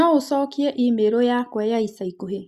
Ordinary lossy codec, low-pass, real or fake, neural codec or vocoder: Opus, 64 kbps; 14.4 kHz; real; none